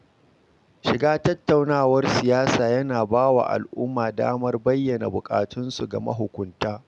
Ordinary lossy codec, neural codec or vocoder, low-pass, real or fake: none; none; 10.8 kHz; real